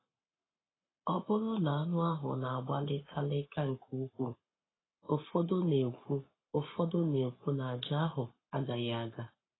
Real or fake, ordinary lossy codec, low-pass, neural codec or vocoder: fake; AAC, 16 kbps; 7.2 kHz; codec, 44.1 kHz, 7.8 kbps, Pupu-Codec